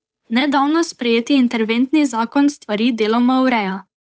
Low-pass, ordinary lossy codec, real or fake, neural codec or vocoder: none; none; fake; codec, 16 kHz, 8 kbps, FunCodec, trained on Chinese and English, 25 frames a second